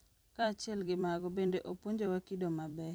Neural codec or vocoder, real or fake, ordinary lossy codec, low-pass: vocoder, 44.1 kHz, 128 mel bands every 256 samples, BigVGAN v2; fake; none; none